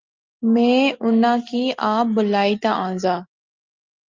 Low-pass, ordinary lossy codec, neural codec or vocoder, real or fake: 7.2 kHz; Opus, 24 kbps; none; real